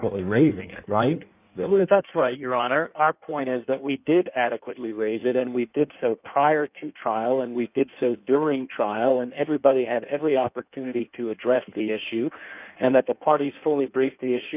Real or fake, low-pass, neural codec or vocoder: fake; 3.6 kHz; codec, 16 kHz in and 24 kHz out, 1.1 kbps, FireRedTTS-2 codec